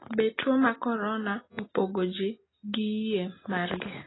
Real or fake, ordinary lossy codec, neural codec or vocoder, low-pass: real; AAC, 16 kbps; none; 7.2 kHz